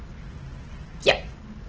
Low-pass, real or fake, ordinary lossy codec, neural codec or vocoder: 7.2 kHz; real; Opus, 16 kbps; none